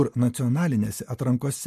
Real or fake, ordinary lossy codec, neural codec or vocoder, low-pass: real; MP3, 64 kbps; none; 14.4 kHz